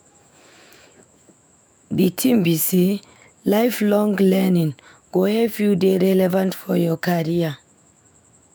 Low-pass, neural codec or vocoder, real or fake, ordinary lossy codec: none; autoencoder, 48 kHz, 128 numbers a frame, DAC-VAE, trained on Japanese speech; fake; none